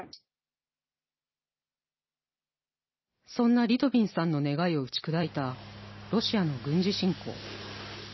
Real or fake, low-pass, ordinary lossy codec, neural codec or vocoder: real; 7.2 kHz; MP3, 24 kbps; none